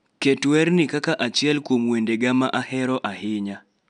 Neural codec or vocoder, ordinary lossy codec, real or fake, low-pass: none; none; real; 9.9 kHz